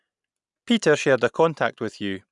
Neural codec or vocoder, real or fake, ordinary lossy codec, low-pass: none; real; none; 10.8 kHz